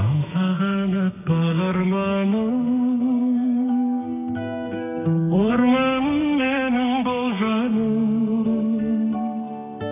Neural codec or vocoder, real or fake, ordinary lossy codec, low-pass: codec, 32 kHz, 1.9 kbps, SNAC; fake; none; 3.6 kHz